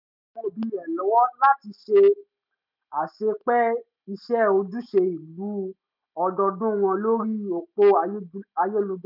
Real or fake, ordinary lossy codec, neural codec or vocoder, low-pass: real; none; none; 5.4 kHz